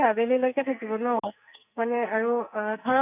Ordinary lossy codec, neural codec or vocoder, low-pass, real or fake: none; codec, 44.1 kHz, 2.6 kbps, SNAC; 3.6 kHz; fake